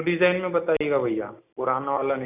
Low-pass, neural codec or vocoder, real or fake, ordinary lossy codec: 3.6 kHz; none; real; none